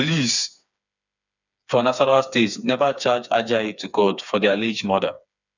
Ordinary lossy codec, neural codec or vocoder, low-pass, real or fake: none; codec, 16 kHz, 4 kbps, FreqCodec, smaller model; 7.2 kHz; fake